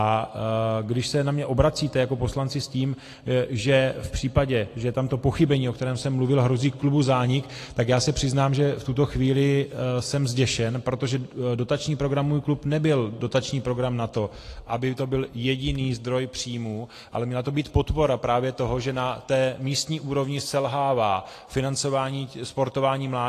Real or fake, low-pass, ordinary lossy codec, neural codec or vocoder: real; 14.4 kHz; AAC, 48 kbps; none